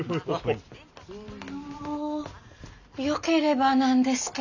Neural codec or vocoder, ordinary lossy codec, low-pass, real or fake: none; none; 7.2 kHz; real